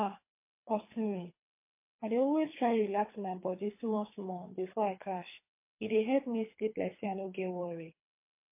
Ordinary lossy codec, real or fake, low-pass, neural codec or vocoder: MP3, 24 kbps; fake; 3.6 kHz; codec, 24 kHz, 3 kbps, HILCodec